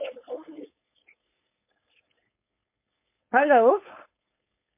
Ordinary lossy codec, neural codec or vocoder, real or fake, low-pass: MP3, 24 kbps; codec, 16 kHz, 4.8 kbps, FACodec; fake; 3.6 kHz